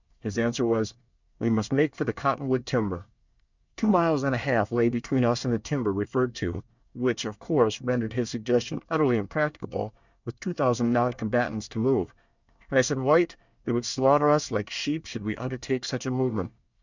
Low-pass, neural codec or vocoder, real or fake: 7.2 kHz; codec, 24 kHz, 1 kbps, SNAC; fake